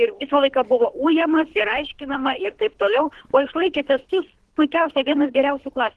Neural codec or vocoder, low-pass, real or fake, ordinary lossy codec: codec, 24 kHz, 3 kbps, HILCodec; 10.8 kHz; fake; Opus, 16 kbps